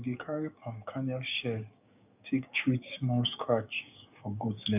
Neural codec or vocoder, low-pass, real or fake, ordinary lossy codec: codec, 16 kHz, 6 kbps, DAC; 3.6 kHz; fake; none